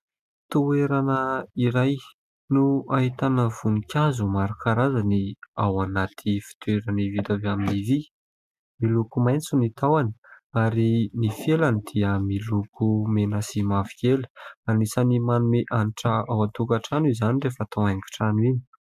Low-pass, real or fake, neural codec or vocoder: 14.4 kHz; real; none